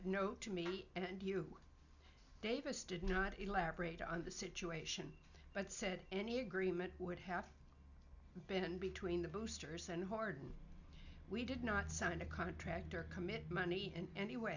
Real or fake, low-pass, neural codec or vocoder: real; 7.2 kHz; none